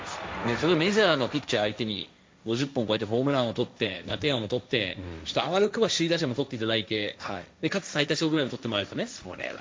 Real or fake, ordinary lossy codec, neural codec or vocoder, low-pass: fake; none; codec, 16 kHz, 1.1 kbps, Voila-Tokenizer; none